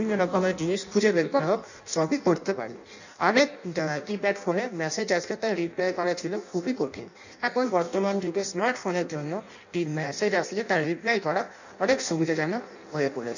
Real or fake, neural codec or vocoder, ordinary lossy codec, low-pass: fake; codec, 16 kHz in and 24 kHz out, 0.6 kbps, FireRedTTS-2 codec; none; 7.2 kHz